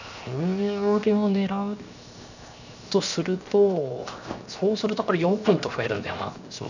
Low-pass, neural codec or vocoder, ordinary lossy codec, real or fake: 7.2 kHz; codec, 16 kHz, 0.7 kbps, FocalCodec; none; fake